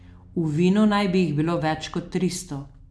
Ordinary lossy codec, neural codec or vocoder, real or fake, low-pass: none; none; real; none